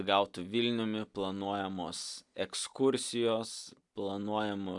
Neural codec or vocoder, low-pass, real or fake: none; 10.8 kHz; real